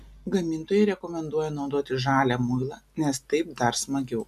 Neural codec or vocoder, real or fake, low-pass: none; real; 14.4 kHz